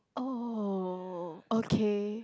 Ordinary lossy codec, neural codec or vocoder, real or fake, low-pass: none; none; real; none